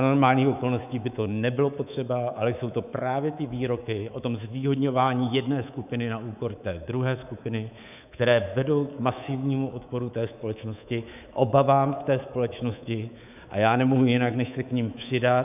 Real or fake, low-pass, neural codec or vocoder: fake; 3.6 kHz; codec, 24 kHz, 3.1 kbps, DualCodec